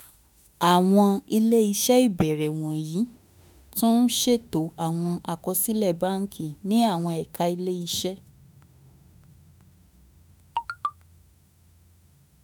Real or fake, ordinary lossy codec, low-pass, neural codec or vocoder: fake; none; none; autoencoder, 48 kHz, 32 numbers a frame, DAC-VAE, trained on Japanese speech